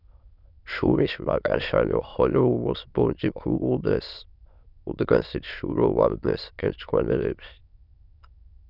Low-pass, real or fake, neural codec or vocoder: 5.4 kHz; fake; autoencoder, 22.05 kHz, a latent of 192 numbers a frame, VITS, trained on many speakers